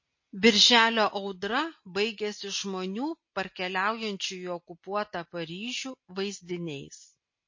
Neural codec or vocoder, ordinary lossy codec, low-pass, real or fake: none; MP3, 32 kbps; 7.2 kHz; real